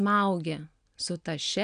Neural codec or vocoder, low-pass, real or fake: none; 9.9 kHz; real